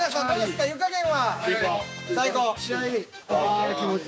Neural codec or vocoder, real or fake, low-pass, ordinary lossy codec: codec, 16 kHz, 6 kbps, DAC; fake; none; none